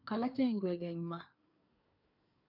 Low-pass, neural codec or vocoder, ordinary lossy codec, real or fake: 5.4 kHz; codec, 24 kHz, 1 kbps, SNAC; none; fake